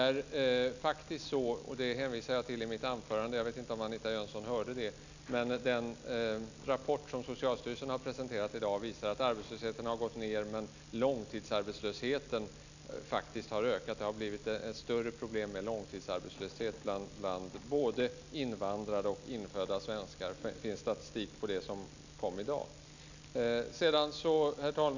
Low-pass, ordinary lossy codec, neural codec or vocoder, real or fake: 7.2 kHz; none; none; real